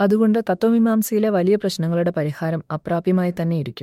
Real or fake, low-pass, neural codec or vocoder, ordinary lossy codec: fake; 19.8 kHz; autoencoder, 48 kHz, 32 numbers a frame, DAC-VAE, trained on Japanese speech; MP3, 64 kbps